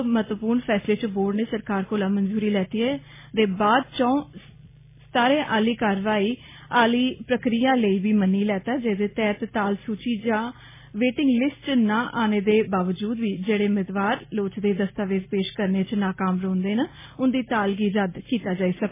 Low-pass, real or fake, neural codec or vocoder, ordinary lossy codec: 3.6 kHz; real; none; MP3, 16 kbps